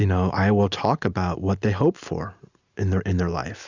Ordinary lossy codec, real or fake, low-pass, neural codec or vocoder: Opus, 64 kbps; real; 7.2 kHz; none